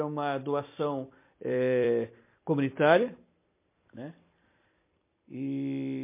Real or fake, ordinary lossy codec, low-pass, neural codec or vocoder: fake; MP3, 24 kbps; 3.6 kHz; vocoder, 44.1 kHz, 128 mel bands every 256 samples, BigVGAN v2